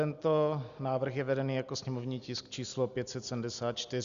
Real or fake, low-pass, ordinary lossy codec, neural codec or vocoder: real; 7.2 kHz; Opus, 64 kbps; none